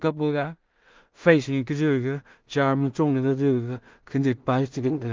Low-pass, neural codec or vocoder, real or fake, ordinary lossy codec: 7.2 kHz; codec, 16 kHz in and 24 kHz out, 0.4 kbps, LongCat-Audio-Codec, two codebook decoder; fake; Opus, 24 kbps